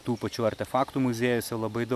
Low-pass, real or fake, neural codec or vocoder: 14.4 kHz; real; none